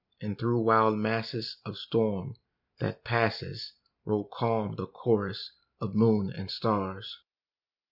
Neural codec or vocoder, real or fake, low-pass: none; real; 5.4 kHz